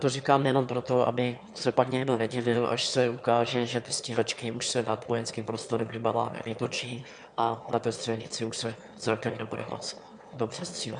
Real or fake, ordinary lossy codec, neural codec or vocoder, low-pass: fake; MP3, 96 kbps; autoencoder, 22.05 kHz, a latent of 192 numbers a frame, VITS, trained on one speaker; 9.9 kHz